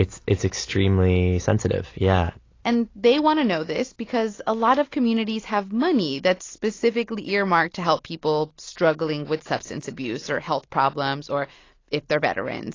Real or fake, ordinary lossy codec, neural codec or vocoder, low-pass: real; AAC, 32 kbps; none; 7.2 kHz